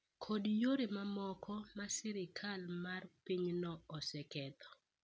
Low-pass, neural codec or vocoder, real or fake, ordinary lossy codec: none; none; real; none